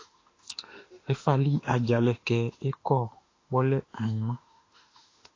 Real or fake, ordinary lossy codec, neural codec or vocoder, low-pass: fake; AAC, 32 kbps; autoencoder, 48 kHz, 32 numbers a frame, DAC-VAE, trained on Japanese speech; 7.2 kHz